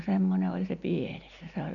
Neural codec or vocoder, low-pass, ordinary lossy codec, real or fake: none; 7.2 kHz; none; real